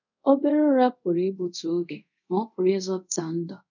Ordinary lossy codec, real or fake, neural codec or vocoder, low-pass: none; fake; codec, 24 kHz, 0.5 kbps, DualCodec; 7.2 kHz